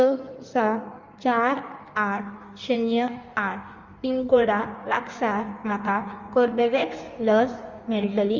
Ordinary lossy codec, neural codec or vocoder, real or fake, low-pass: Opus, 32 kbps; codec, 16 kHz in and 24 kHz out, 1.1 kbps, FireRedTTS-2 codec; fake; 7.2 kHz